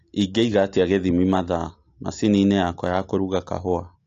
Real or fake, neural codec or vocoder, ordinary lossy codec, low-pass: real; none; AAC, 48 kbps; 7.2 kHz